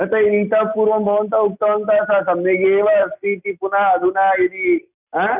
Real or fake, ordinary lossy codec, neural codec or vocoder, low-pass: real; none; none; 3.6 kHz